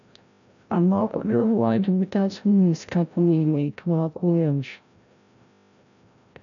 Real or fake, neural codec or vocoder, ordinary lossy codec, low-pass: fake; codec, 16 kHz, 0.5 kbps, FreqCodec, larger model; none; 7.2 kHz